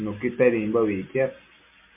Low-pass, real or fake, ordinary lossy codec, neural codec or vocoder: 3.6 kHz; real; MP3, 24 kbps; none